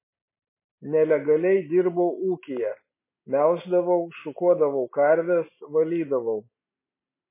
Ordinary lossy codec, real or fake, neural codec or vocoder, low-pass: MP3, 16 kbps; real; none; 3.6 kHz